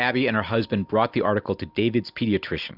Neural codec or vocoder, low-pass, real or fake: none; 5.4 kHz; real